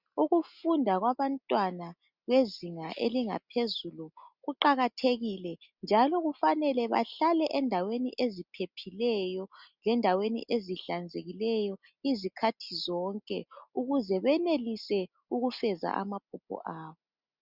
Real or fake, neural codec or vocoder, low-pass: real; none; 5.4 kHz